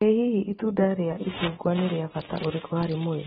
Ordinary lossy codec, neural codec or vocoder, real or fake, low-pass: AAC, 16 kbps; none; real; 7.2 kHz